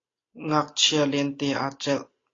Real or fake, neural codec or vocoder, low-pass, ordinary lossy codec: real; none; 7.2 kHz; AAC, 32 kbps